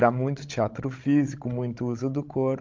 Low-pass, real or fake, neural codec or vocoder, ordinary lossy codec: 7.2 kHz; fake; codec, 16 kHz, 16 kbps, FreqCodec, larger model; Opus, 24 kbps